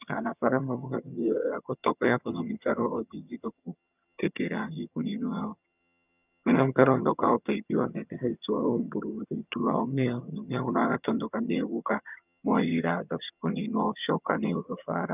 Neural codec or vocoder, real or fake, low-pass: vocoder, 22.05 kHz, 80 mel bands, HiFi-GAN; fake; 3.6 kHz